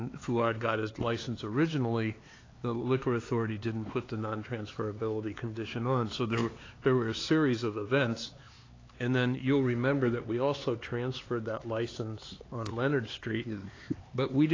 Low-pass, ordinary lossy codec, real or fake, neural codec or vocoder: 7.2 kHz; AAC, 32 kbps; fake; codec, 16 kHz, 4 kbps, X-Codec, HuBERT features, trained on LibriSpeech